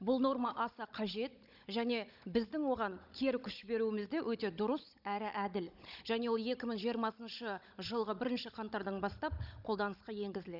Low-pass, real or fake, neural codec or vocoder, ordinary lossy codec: 5.4 kHz; fake; codec, 16 kHz, 16 kbps, FunCodec, trained on Chinese and English, 50 frames a second; none